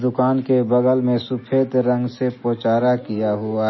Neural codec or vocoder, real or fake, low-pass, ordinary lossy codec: none; real; 7.2 kHz; MP3, 24 kbps